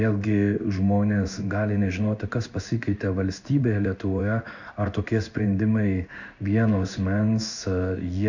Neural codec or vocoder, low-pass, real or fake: codec, 16 kHz in and 24 kHz out, 1 kbps, XY-Tokenizer; 7.2 kHz; fake